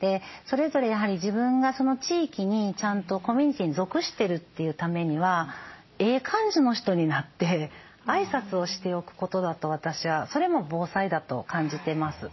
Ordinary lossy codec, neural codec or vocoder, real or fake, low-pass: MP3, 24 kbps; none; real; 7.2 kHz